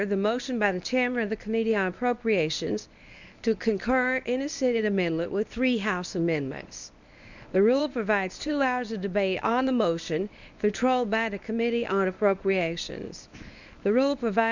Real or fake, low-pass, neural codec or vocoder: fake; 7.2 kHz; codec, 24 kHz, 0.9 kbps, WavTokenizer, medium speech release version 1